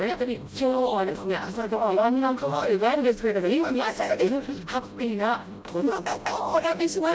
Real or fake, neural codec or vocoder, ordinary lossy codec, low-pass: fake; codec, 16 kHz, 0.5 kbps, FreqCodec, smaller model; none; none